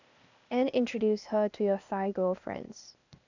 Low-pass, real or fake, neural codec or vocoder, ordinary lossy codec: 7.2 kHz; fake; codec, 16 kHz, 0.8 kbps, ZipCodec; none